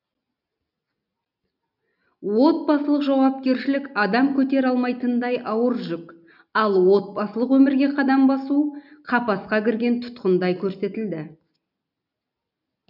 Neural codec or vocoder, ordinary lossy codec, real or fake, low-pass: none; none; real; 5.4 kHz